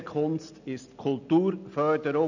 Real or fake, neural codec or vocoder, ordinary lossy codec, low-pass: real; none; none; 7.2 kHz